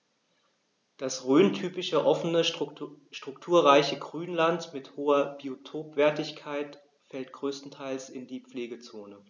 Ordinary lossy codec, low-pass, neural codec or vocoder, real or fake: none; 7.2 kHz; none; real